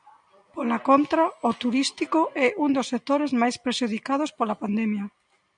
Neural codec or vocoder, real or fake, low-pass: none; real; 9.9 kHz